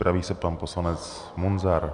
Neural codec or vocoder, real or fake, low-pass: none; real; 10.8 kHz